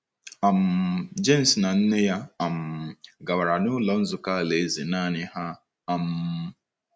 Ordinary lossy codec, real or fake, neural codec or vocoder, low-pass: none; real; none; none